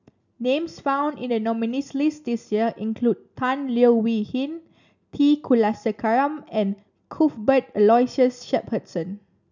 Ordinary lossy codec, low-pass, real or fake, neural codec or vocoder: none; 7.2 kHz; real; none